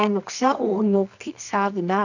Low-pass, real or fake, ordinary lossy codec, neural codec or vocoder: 7.2 kHz; fake; none; codec, 24 kHz, 0.9 kbps, WavTokenizer, medium music audio release